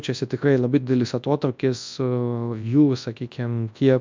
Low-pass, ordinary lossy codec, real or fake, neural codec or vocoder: 7.2 kHz; MP3, 64 kbps; fake; codec, 24 kHz, 0.9 kbps, WavTokenizer, large speech release